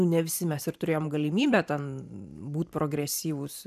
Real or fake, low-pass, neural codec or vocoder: fake; 14.4 kHz; vocoder, 44.1 kHz, 128 mel bands every 512 samples, BigVGAN v2